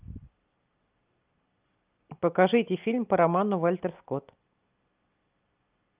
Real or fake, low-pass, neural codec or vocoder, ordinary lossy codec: real; 3.6 kHz; none; Opus, 24 kbps